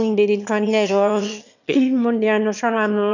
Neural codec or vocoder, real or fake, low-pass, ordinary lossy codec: autoencoder, 22.05 kHz, a latent of 192 numbers a frame, VITS, trained on one speaker; fake; 7.2 kHz; none